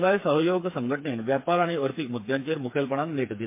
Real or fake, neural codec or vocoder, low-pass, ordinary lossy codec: fake; codec, 16 kHz, 4 kbps, FreqCodec, smaller model; 3.6 kHz; MP3, 24 kbps